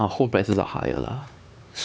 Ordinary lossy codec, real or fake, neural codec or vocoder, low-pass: none; fake; codec, 16 kHz, 4 kbps, X-Codec, HuBERT features, trained on LibriSpeech; none